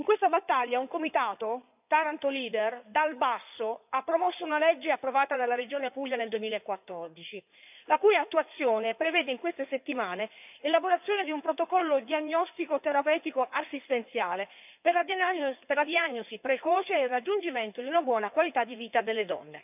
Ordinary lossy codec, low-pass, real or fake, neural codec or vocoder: none; 3.6 kHz; fake; codec, 16 kHz in and 24 kHz out, 2.2 kbps, FireRedTTS-2 codec